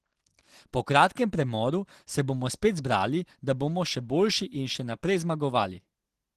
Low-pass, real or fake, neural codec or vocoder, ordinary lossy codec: 14.4 kHz; real; none; Opus, 16 kbps